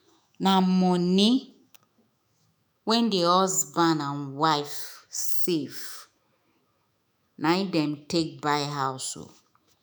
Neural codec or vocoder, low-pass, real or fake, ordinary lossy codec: autoencoder, 48 kHz, 128 numbers a frame, DAC-VAE, trained on Japanese speech; none; fake; none